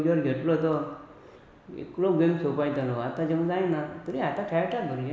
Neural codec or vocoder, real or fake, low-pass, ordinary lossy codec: none; real; none; none